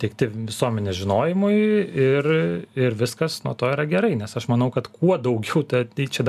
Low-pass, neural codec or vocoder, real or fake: 14.4 kHz; none; real